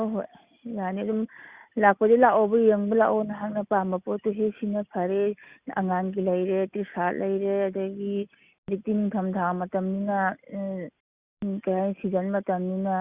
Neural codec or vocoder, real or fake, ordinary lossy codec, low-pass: none; real; Opus, 64 kbps; 3.6 kHz